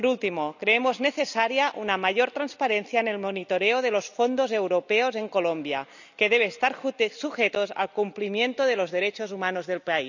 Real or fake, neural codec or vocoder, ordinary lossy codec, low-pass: real; none; none; 7.2 kHz